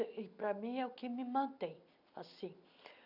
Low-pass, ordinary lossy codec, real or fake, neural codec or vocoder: 5.4 kHz; none; real; none